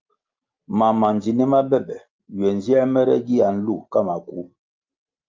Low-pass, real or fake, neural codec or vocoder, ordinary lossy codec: 7.2 kHz; real; none; Opus, 24 kbps